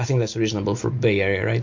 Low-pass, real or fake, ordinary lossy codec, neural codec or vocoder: 7.2 kHz; real; MP3, 48 kbps; none